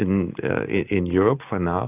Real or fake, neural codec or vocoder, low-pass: real; none; 3.6 kHz